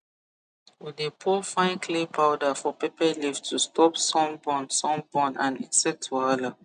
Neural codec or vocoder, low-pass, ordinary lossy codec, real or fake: none; 9.9 kHz; none; real